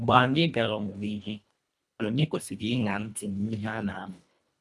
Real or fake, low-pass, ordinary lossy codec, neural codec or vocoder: fake; none; none; codec, 24 kHz, 1.5 kbps, HILCodec